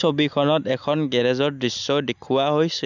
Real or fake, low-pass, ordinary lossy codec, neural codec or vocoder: real; 7.2 kHz; none; none